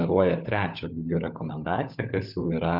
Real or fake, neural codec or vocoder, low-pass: fake; codec, 16 kHz, 16 kbps, FunCodec, trained on LibriTTS, 50 frames a second; 5.4 kHz